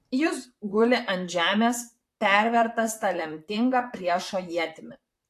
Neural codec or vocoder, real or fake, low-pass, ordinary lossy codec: vocoder, 44.1 kHz, 128 mel bands, Pupu-Vocoder; fake; 14.4 kHz; AAC, 64 kbps